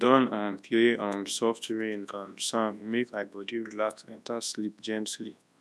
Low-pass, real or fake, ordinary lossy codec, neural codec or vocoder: none; fake; none; codec, 24 kHz, 0.9 kbps, WavTokenizer, large speech release